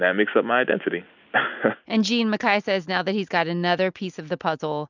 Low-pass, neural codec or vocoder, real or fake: 7.2 kHz; none; real